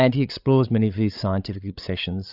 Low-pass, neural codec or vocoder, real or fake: 5.4 kHz; codec, 16 kHz, 8 kbps, FreqCodec, larger model; fake